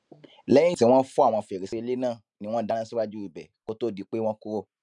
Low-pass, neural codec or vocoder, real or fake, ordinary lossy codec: 10.8 kHz; none; real; none